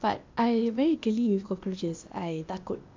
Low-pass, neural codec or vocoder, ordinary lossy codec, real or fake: 7.2 kHz; codec, 16 kHz, 0.8 kbps, ZipCodec; MP3, 64 kbps; fake